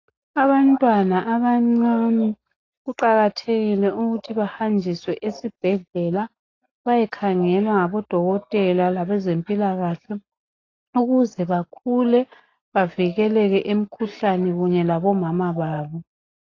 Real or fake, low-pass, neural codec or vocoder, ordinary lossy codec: real; 7.2 kHz; none; AAC, 32 kbps